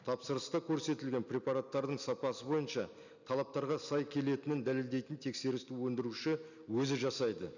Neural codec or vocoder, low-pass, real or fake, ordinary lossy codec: none; 7.2 kHz; real; none